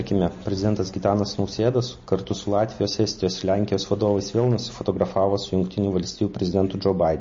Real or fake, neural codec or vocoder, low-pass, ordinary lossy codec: real; none; 7.2 kHz; MP3, 32 kbps